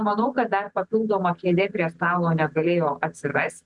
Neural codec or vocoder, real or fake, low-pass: none; real; 10.8 kHz